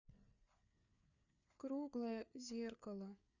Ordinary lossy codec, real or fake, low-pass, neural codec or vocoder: none; fake; 7.2 kHz; codec, 16 kHz, 4 kbps, FreqCodec, larger model